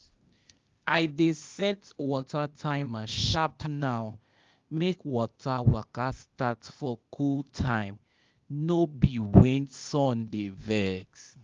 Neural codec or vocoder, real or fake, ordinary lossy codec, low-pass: codec, 16 kHz, 0.8 kbps, ZipCodec; fake; Opus, 32 kbps; 7.2 kHz